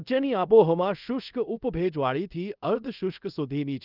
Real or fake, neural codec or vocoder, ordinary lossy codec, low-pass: fake; codec, 24 kHz, 0.5 kbps, DualCodec; Opus, 32 kbps; 5.4 kHz